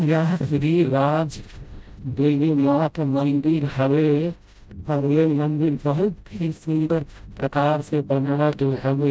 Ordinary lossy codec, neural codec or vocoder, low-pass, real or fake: none; codec, 16 kHz, 0.5 kbps, FreqCodec, smaller model; none; fake